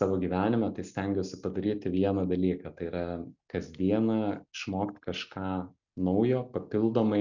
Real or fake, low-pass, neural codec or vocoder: real; 7.2 kHz; none